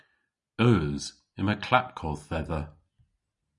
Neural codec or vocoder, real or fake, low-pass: none; real; 10.8 kHz